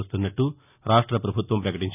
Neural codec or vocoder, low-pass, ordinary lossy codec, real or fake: none; 3.6 kHz; none; real